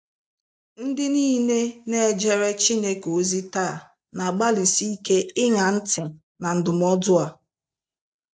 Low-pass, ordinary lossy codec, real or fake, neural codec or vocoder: 9.9 kHz; AAC, 64 kbps; real; none